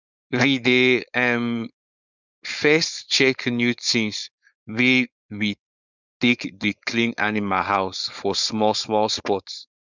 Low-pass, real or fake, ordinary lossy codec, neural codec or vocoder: 7.2 kHz; fake; none; codec, 16 kHz, 4.8 kbps, FACodec